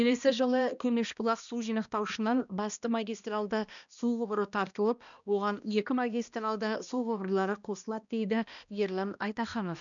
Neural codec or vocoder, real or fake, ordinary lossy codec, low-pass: codec, 16 kHz, 1 kbps, X-Codec, HuBERT features, trained on balanced general audio; fake; none; 7.2 kHz